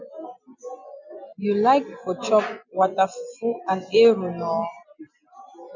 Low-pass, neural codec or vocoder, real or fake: 7.2 kHz; none; real